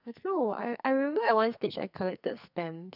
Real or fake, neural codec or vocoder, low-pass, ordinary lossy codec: fake; codec, 44.1 kHz, 2.6 kbps, SNAC; 5.4 kHz; none